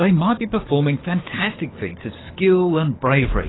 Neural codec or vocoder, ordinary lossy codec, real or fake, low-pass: codec, 24 kHz, 6 kbps, HILCodec; AAC, 16 kbps; fake; 7.2 kHz